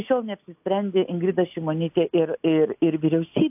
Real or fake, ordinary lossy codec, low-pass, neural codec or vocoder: real; AAC, 32 kbps; 3.6 kHz; none